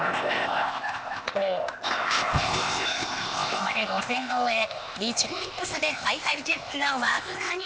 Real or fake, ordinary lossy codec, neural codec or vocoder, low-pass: fake; none; codec, 16 kHz, 0.8 kbps, ZipCodec; none